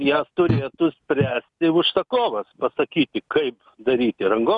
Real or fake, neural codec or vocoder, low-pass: real; none; 10.8 kHz